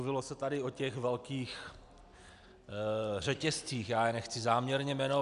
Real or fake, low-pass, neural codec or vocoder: real; 10.8 kHz; none